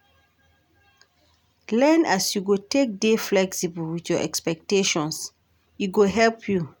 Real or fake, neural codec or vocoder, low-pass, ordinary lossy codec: real; none; none; none